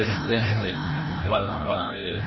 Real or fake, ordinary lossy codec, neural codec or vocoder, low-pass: fake; MP3, 24 kbps; codec, 16 kHz, 0.5 kbps, FreqCodec, larger model; 7.2 kHz